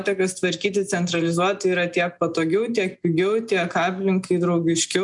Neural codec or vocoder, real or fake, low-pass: none; real; 10.8 kHz